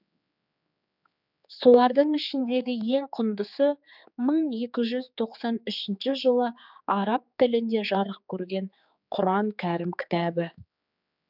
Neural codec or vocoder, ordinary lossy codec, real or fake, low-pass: codec, 16 kHz, 4 kbps, X-Codec, HuBERT features, trained on general audio; none; fake; 5.4 kHz